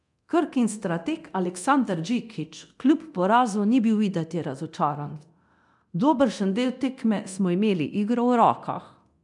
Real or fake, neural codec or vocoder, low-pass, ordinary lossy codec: fake; codec, 24 kHz, 0.9 kbps, DualCodec; 10.8 kHz; none